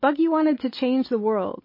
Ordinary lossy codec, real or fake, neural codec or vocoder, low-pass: MP3, 24 kbps; real; none; 5.4 kHz